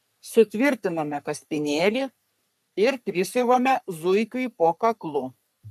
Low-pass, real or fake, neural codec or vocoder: 14.4 kHz; fake; codec, 44.1 kHz, 3.4 kbps, Pupu-Codec